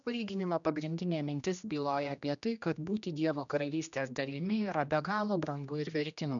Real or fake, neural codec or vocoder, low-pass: fake; codec, 16 kHz, 1 kbps, X-Codec, HuBERT features, trained on general audio; 7.2 kHz